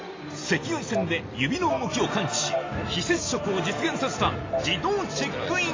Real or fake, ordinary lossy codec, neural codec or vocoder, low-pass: real; AAC, 32 kbps; none; 7.2 kHz